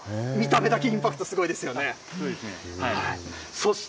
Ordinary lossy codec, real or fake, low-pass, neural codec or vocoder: none; real; none; none